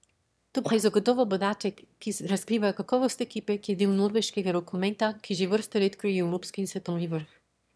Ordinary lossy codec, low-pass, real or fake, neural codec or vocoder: none; none; fake; autoencoder, 22.05 kHz, a latent of 192 numbers a frame, VITS, trained on one speaker